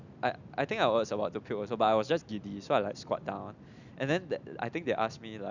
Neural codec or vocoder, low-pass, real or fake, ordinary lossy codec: none; 7.2 kHz; real; none